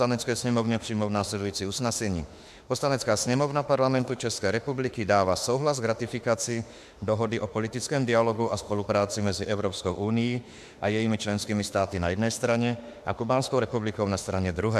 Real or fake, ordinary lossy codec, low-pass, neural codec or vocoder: fake; AAC, 96 kbps; 14.4 kHz; autoencoder, 48 kHz, 32 numbers a frame, DAC-VAE, trained on Japanese speech